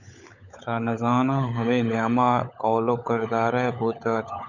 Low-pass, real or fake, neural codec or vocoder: 7.2 kHz; fake; codec, 16 kHz, 16 kbps, FunCodec, trained on LibriTTS, 50 frames a second